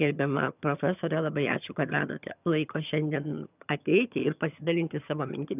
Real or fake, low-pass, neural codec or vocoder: fake; 3.6 kHz; vocoder, 22.05 kHz, 80 mel bands, HiFi-GAN